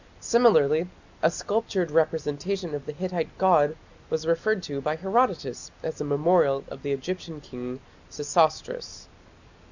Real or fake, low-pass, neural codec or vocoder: real; 7.2 kHz; none